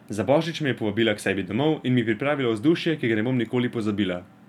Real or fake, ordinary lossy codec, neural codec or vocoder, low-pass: real; none; none; 19.8 kHz